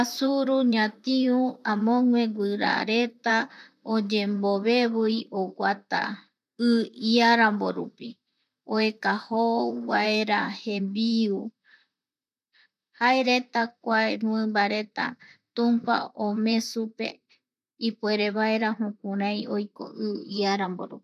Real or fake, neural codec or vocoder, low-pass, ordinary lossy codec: fake; vocoder, 44.1 kHz, 128 mel bands, Pupu-Vocoder; 14.4 kHz; none